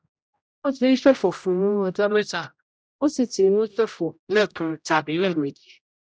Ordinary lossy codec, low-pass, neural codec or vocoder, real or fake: none; none; codec, 16 kHz, 0.5 kbps, X-Codec, HuBERT features, trained on general audio; fake